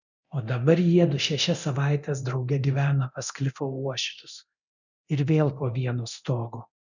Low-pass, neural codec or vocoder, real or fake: 7.2 kHz; codec, 24 kHz, 0.9 kbps, DualCodec; fake